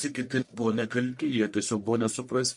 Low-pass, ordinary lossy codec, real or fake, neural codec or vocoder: 10.8 kHz; MP3, 48 kbps; fake; codec, 44.1 kHz, 1.7 kbps, Pupu-Codec